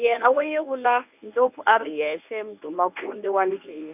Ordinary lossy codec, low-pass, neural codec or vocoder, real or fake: none; 3.6 kHz; codec, 24 kHz, 0.9 kbps, WavTokenizer, medium speech release version 1; fake